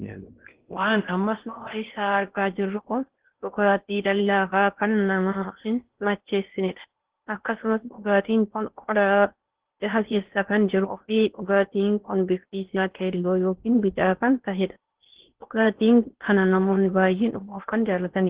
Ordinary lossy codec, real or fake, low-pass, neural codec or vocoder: Opus, 16 kbps; fake; 3.6 kHz; codec, 16 kHz in and 24 kHz out, 0.6 kbps, FocalCodec, streaming, 4096 codes